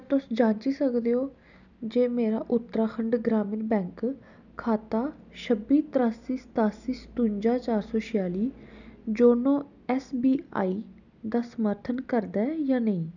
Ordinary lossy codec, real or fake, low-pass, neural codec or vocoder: none; real; 7.2 kHz; none